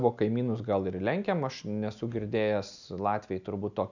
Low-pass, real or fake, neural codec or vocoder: 7.2 kHz; real; none